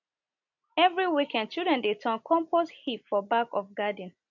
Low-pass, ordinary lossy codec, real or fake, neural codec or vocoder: 7.2 kHz; MP3, 64 kbps; real; none